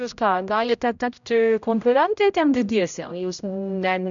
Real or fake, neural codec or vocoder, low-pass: fake; codec, 16 kHz, 0.5 kbps, X-Codec, HuBERT features, trained on general audio; 7.2 kHz